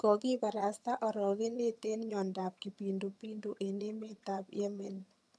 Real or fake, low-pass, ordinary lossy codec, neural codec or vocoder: fake; none; none; vocoder, 22.05 kHz, 80 mel bands, HiFi-GAN